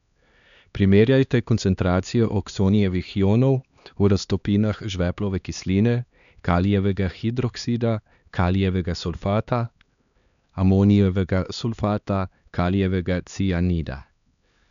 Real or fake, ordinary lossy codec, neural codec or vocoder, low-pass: fake; none; codec, 16 kHz, 2 kbps, X-Codec, HuBERT features, trained on LibriSpeech; 7.2 kHz